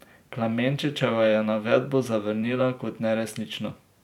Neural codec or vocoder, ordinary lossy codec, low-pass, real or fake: vocoder, 44.1 kHz, 128 mel bands every 512 samples, BigVGAN v2; none; 19.8 kHz; fake